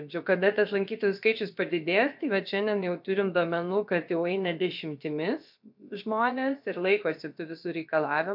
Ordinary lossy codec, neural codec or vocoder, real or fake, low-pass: MP3, 48 kbps; codec, 16 kHz, about 1 kbps, DyCAST, with the encoder's durations; fake; 5.4 kHz